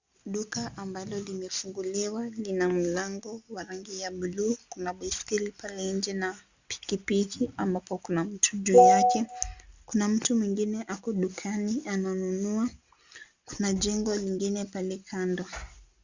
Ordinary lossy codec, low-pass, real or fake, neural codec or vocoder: Opus, 64 kbps; 7.2 kHz; real; none